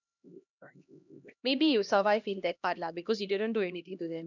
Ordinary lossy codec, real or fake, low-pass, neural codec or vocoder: none; fake; 7.2 kHz; codec, 16 kHz, 1 kbps, X-Codec, HuBERT features, trained on LibriSpeech